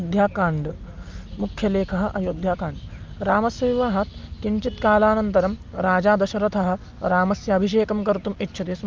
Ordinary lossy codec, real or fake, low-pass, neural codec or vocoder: Opus, 24 kbps; real; 7.2 kHz; none